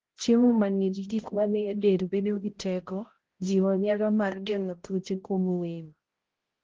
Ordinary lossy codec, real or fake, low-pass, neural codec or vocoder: Opus, 16 kbps; fake; 7.2 kHz; codec, 16 kHz, 0.5 kbps, X-Codec, HuBERT features, trained on balanced general audio